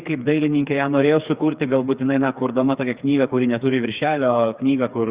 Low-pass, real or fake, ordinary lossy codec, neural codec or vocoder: 3.6 kHz; fake; Opus, 64 kbps; codec, 16 kHz, 4 kbps, FreqCodec, smaller model